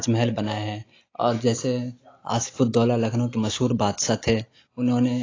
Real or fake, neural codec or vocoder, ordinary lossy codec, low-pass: real; none; AAC, 32 kbps; 7.2 kHz